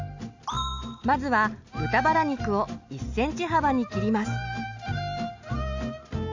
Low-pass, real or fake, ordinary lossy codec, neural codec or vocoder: 7.2 kHz; real; AAC, 48 kbps; none